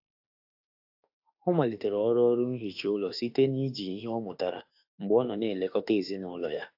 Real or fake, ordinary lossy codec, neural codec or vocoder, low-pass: fake; AAC, 48 kbps; autoencoder, 48 kHz, 32 numbers a frame, DAC-VAE, trained on Japanese speech; 5.4 kHz